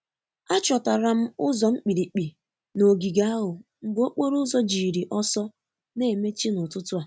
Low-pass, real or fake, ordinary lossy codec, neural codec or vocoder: none; real; none; none